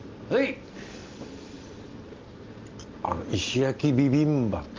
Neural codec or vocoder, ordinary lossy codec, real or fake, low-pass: none; Opus, 32 kbps; real; 7.2 kHz